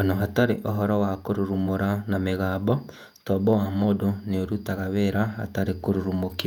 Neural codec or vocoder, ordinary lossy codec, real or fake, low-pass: vocoder, 48 kHz, 128 mel bands, Vocos; none; fake; 19.8 kHz